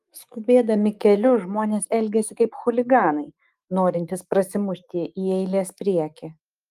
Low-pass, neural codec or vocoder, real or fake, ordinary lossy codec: 14.4 kHz; autoencoder, 48 kHz, 128 numbers a frame, DAC-VAE, trained on Japanese speech; fake; Opus, 32 kbps